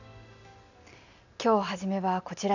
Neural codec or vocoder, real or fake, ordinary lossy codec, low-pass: none; real; none; 7.2 kHz